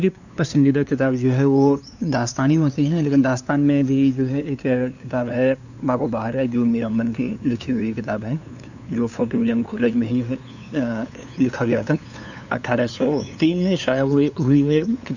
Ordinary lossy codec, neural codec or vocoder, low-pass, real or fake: none; codec, 16 kHz, 2 kbps, FunCodec, trained on LibriTTS, 25 frames a second; 7.2 kHz; fake